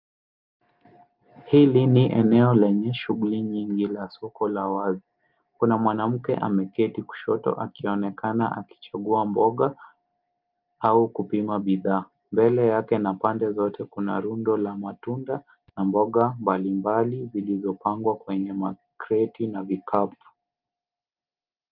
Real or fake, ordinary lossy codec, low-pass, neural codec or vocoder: real; Opus, 24 kbps; 5.4 kHz; none